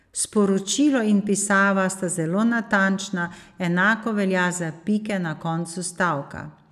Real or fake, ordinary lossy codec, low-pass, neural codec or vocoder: real; none; 14.4 kHz; none